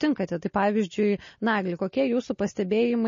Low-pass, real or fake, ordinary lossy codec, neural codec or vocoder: 7.2 kHz; real; MP3, 32 kbps; none